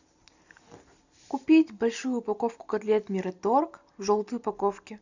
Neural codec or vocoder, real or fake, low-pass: none; real; 7.2 kHz